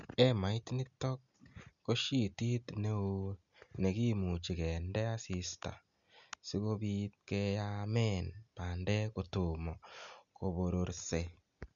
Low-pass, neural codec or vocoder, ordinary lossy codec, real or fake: 7.2 kHz; none; none; real